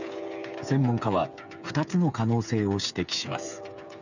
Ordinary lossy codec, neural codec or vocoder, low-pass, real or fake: none; codec, 16 kHz, 8 kbps, FreqCodec, smaller model; 7.2 kHz; fake